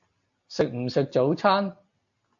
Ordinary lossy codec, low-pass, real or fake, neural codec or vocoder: AAC, 64 kbps; 7.2 kHz; real; none